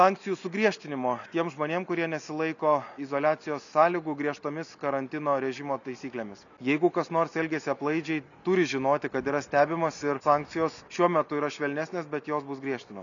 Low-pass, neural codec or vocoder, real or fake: 7.2 kHz; none; real